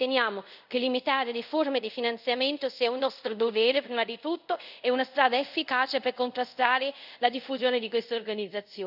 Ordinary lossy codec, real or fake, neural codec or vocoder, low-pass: none; fake; codec, 24 kHz, 0.5 kbps, DualCodec; 5.4 kHz